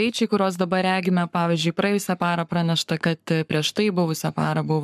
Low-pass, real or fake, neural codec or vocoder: 14.4 kHz; fake; codec, 44.1 kHz, 7.8 kbps, Pupu-Codec